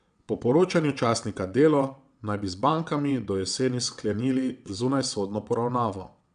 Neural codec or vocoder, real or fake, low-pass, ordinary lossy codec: vocoder, 22.05 kHz, 80 mel bands, WaveNeXt; fake; 9.9 kHz; none